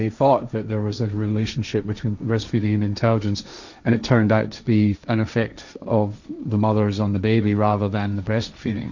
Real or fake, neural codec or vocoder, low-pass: fake; codec, 16 kHz, 1.1 kbps, Voila-Tokenizer; 7.2 kHz